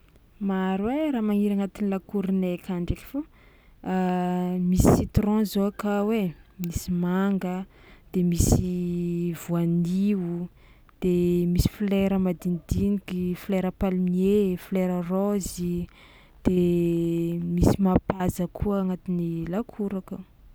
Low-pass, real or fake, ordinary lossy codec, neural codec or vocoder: none; real; none; none